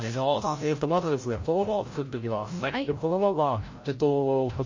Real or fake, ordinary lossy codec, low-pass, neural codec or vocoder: fake; MP3, 32 kbps; 7.2 kHz; codec, 16 kHz, 0.5 kbps, FreqCodec, larger model